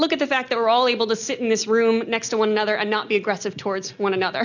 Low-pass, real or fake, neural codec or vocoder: 7.2 kHz; real; none